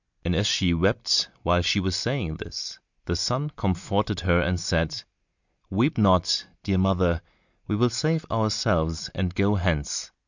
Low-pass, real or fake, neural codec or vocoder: 7.2 kHz; real; none